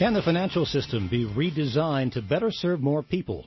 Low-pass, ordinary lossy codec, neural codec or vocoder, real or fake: 7.2 kHz; MP3, 24 kbps; none; real